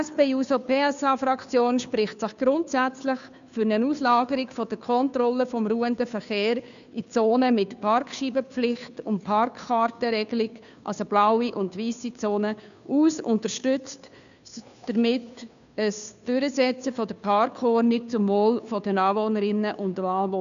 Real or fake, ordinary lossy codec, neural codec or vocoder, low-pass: fake; none; codec, 16 kHz, 2 kbps, FunCodec, trained on Chinese and English, 25 frames a second; 7.2 kHz